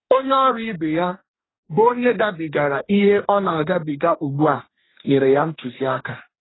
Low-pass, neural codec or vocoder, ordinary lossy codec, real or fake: 7.2 kHz; codec, 44.1 kHz, 2.6 kbps, DAC; AAC, 16 kbps; fake